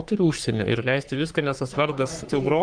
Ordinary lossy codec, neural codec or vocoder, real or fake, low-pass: Opus, 32 kbps; codec, 44.1 kHz, 3.4 kbps, Pupu-Codec; fake; 9.9 kHz